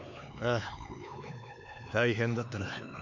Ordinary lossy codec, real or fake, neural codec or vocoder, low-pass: none; fake; codec, 16 kHz, 4 kbps, X-Codec, HuBERT features, trained on LibriSpeech; 7.2 kHz